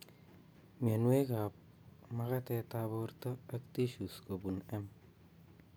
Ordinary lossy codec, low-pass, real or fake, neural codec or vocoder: none; none; real; none